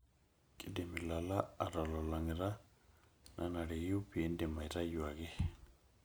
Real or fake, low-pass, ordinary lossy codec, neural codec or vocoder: real; none; none; none